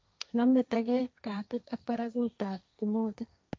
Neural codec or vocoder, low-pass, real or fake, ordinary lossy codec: codec, 16 kHz, 1.1 kbps, Voila-Tokenizer; none; fake; none